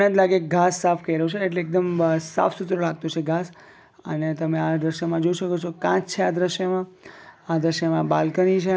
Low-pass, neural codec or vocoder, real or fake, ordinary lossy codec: none; none; real; none